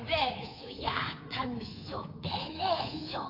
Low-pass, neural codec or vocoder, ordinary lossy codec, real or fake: 5.4 kHz; codec, 24 kHz, 3.1 kbps, DualCodec; AAC, 24 kbps; fake